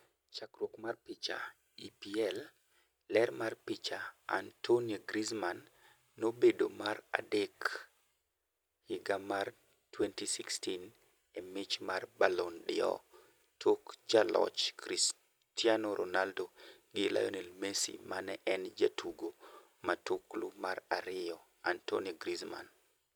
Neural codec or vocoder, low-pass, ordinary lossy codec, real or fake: none; none; none; real